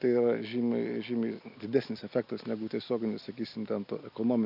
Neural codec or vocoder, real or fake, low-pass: none; real; 5.4 kHz